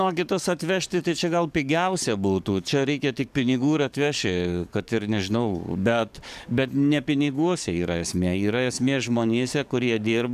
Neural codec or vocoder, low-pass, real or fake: codec, 44.1 kHz, 7.8 kbps, DAC; 14.4 kHz; fake